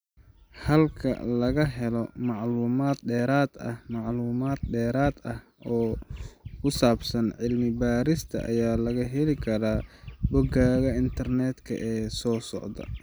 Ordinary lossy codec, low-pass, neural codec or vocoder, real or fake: none; none; none; real